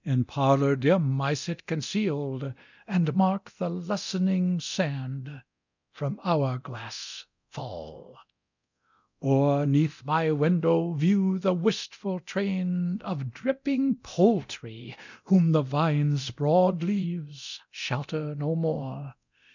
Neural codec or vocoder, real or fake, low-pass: codec, 24 kHz, 0.9 kbps, DualCodec; fake; 7.2 kHz